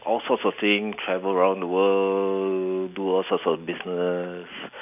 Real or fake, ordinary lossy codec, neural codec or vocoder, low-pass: real; none; none; 3.6 kHz